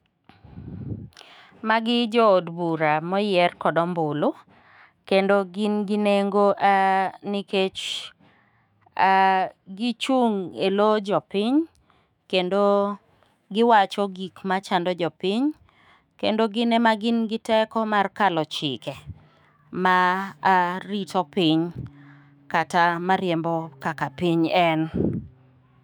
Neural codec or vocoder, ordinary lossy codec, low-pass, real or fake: autoencoder, 48 kHz, 128 numbers a frame, DAC-VAE, trained on Japanese speech; none; 19.8 kHz; fake